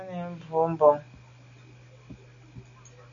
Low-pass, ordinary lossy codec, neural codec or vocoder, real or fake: 7.2 kHz; MP3, 64 kbps; none; real